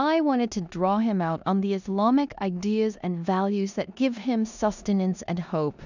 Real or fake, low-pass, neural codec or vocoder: fake; 7.2 kHz; codec, 16 kHz in and 24 kHz out, 0.9 kbps, LongCat-Audio-Codec, four codebook decoder